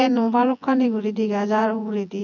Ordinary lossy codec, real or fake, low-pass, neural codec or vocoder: none; fake; 7.2 kHz; vocoder, 24 kHz, 100 mel bands, Vocos